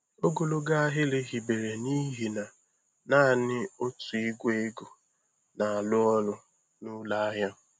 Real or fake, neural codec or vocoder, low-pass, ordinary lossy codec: real; none; none; none